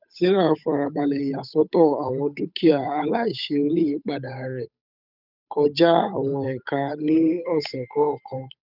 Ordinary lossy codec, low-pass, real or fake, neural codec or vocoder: none; 5.4 kHz; fake; codec, 16 kHz, 8 kbps, FunCodec, trained on Chinese and English, 25 frames a second